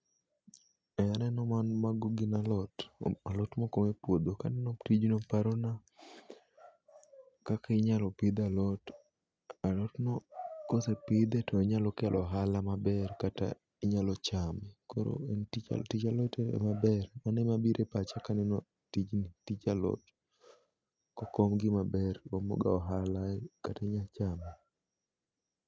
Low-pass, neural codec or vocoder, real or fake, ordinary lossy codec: none; none; real; none